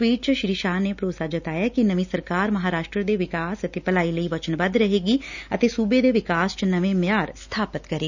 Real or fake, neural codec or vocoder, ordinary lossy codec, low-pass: real; none; none; 7.2 kHz